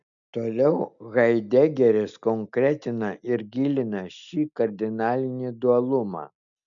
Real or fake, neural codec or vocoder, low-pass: real; none; 7.2 kHz